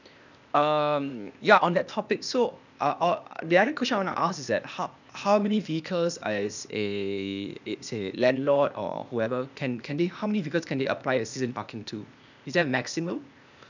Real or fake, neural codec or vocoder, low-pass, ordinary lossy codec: fake; codec, 16 kHz, 0.8 kbps, ZipCodec; 7.2 kHz; none